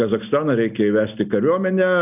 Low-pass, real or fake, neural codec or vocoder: 3.6 kHz; real; none